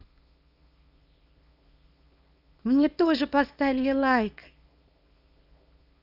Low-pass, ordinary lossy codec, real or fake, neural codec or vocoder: 5.4 kHz; none; fake; codec, 24 kHz, 0.9 kbps, WavTokenizer, small release